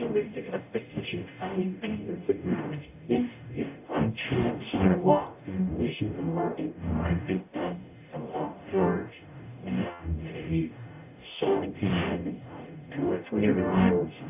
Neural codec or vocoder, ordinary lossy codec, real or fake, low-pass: codec, 44.1 kHz, 0.9 kbps, DAC; AAC, 32 kbps; fake; 3.6 kHz